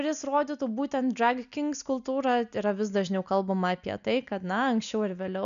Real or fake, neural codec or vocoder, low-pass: real; none; 7.2 kHz